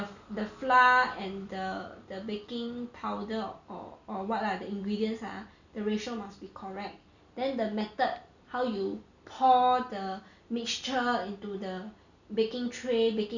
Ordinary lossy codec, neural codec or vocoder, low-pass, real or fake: none; none; 7.2 kHz; real